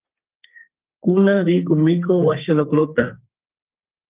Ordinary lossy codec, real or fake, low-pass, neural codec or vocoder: Opus, 32 kbps; fake; 3.6 kHz; codec, 32 kHz, 1.9 kbps, SNAC